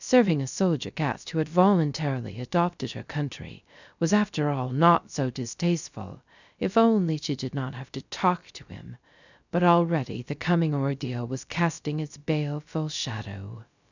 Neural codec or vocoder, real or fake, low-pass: codec, 16 kHz, 0.3 kbps, FocalCodec; fake; 7.2 kHz